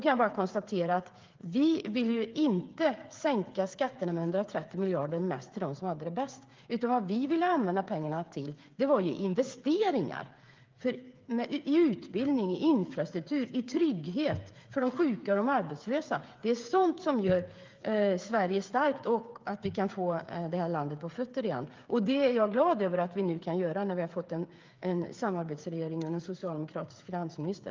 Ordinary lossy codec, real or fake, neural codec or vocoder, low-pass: Opus, 32 kbps; fake; codec, 16 kHz, 8 kbps, FreqCodec, smaller model; 7.2 kHz